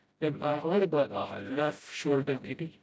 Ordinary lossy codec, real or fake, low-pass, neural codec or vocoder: none; fake; none; codec, 16 kHz, 0.5 kbps, FreqCodec, smaller model